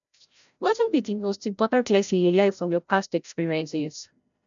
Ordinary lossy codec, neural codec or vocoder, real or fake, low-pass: none; codec, 16 kHz, 0.5 kbps, FreqCodec, larger model; fake; 7.2 kHz